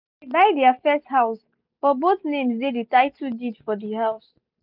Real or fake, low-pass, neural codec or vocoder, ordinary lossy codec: real; 5.4 kHz; none; none